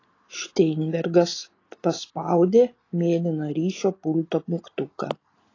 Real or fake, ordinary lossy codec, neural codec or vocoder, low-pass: real; AAC, 32 kbps; none; 7.2 kHz